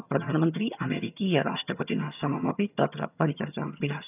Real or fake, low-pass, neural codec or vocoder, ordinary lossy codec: fake; 3.6 kHz; vocoder, 22.05 kHz, 80 mel bands, HiFi-GAN; none